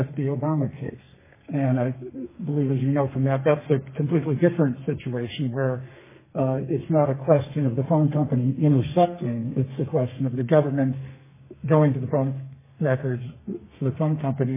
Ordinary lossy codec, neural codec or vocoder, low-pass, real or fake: MP3, 16 kbps; codec, 32 kHz, 1.9 kbps, SNAC; 3.6 kHz; fake